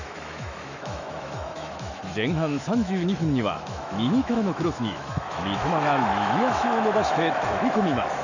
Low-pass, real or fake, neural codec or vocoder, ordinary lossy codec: 7.2 kHz; fake; autoencoder, 48 kHz, 128 numbers a frame, DAC-VAE, trained on Japanese speech; none